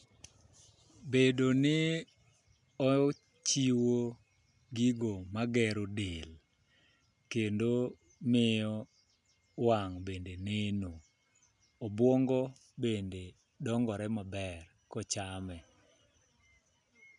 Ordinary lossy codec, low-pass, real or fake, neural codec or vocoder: none; 10.8 kHz; real; none